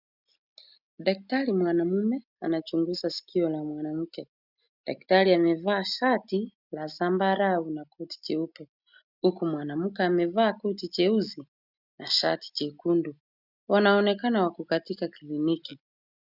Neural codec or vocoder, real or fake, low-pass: none; real; 5.4 kHz